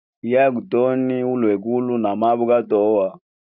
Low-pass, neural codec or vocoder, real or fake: 5.4 kHz; none; real